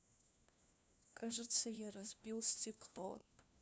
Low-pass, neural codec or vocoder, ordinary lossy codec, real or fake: none; codec, 16 kHz, 1 kbps, FunCodec, trained on LibriTTS, 50 frames a second; none; fake